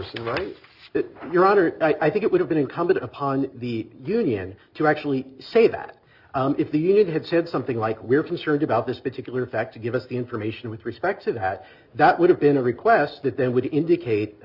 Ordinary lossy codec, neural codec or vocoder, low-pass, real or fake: Opus, 64 kbps; none; 5.4 kHz; real